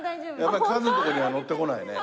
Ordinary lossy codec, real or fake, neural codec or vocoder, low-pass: none; real; none; none